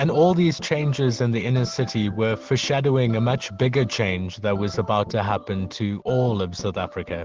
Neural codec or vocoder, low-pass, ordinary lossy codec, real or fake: none; 7.2 kHz; Opus, 16 kbps; real